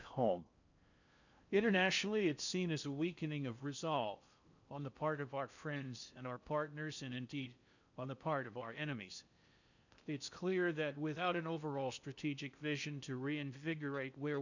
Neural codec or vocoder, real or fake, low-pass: codec, 16 kHz in and 24 kHz out, 0.6 kbps, FocalCodec, streaming, 4096 codes; fake; 7.2 kHz